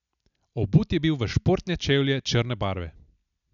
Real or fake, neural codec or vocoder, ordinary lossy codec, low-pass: real; none; none; 7.2 kHz